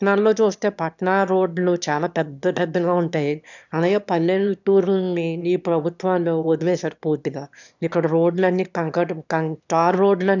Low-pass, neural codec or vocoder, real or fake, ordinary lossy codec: 7.2 kHz; autoencoder, 22.05 kHz, a latent of 192 numbers a frame, VITS, trained on one speaker; fake; none